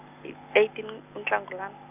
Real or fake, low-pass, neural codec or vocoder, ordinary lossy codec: real; 3.6 kHz; none; none